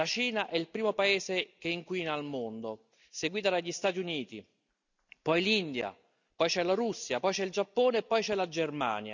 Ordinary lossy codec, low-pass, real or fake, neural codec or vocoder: none; 7.2 kHz; real; none